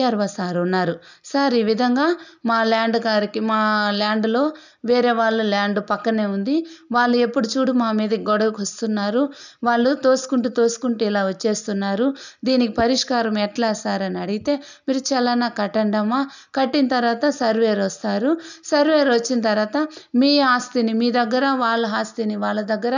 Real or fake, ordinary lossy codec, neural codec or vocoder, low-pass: real; none; none; 7.2 kHz